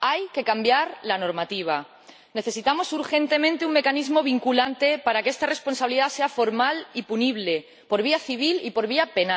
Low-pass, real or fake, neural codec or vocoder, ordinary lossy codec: none; real; none; none